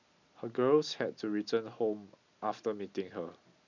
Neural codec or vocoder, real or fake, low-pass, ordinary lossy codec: none; real; 7.2 kHz; none